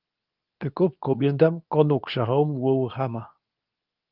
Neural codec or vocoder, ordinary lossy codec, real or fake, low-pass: codec, 24 kHz, 0.9 kbps, WavTokenizer, medium speech release version 2; Opus, 24 kbps; fake; 5.4 kHz